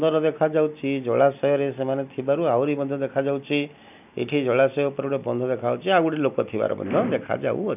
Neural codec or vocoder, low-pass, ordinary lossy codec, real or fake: none; 3.6 kHz; none; real